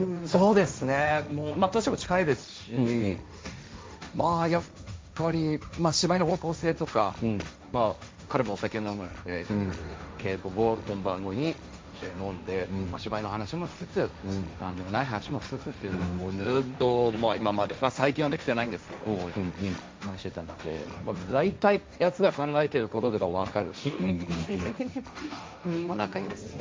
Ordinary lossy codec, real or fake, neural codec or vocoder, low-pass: none; fake; codec, 16 kHz, 1.1 kbps, Voila-Tokenizer; none